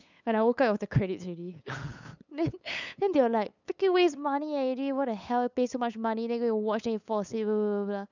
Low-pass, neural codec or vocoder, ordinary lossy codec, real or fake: 7.2 kHz; codec, 16 kHz, 8 kbps, FunCodec, trained on LibriTTS, 25 frames a second; none; fake